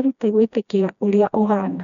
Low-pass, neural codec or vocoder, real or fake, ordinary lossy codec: 7.2 kHz; codec, 16 kHz, 1 kbps, FreqCodec, smaller model; fake; none